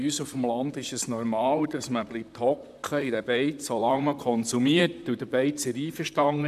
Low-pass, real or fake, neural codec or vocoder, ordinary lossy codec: 14.4 kHz; fake; vocoder, 44.1 kHz, 128 mel bands, Pupu-Vocoder; none